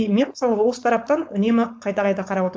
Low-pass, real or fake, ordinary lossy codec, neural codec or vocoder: none; fake; none; codec, 16 kHz, 4.8 kbps, FACodec